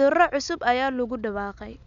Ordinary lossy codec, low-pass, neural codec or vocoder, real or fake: none; 7.2 kHz; none; real